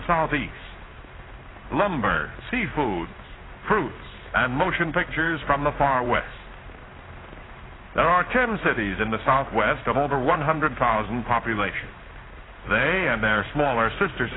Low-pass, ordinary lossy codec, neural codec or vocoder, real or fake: 7.2 kHz; AAC, 16 kbps; codec, 16 kHz in and 24 kHz out, 1 kbps, XY-Tokenizer; fake